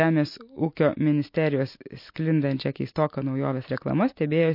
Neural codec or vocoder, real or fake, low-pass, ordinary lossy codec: none; real; 5.4 kHz; MP3, 32 kbps